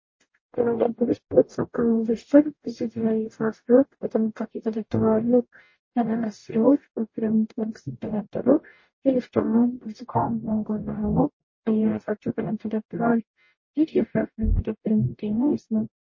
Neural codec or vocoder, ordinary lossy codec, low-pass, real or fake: codec, 44.1 kHz, 0.9 kbps, DAC; MP3, 32 kbps; 7.2 kHz; fake